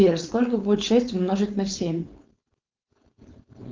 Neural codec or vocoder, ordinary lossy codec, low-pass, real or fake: codec, 16 kHz, 4.8 kbps, FACodec; Opus, 24 kbps; 7.2 kHz; fake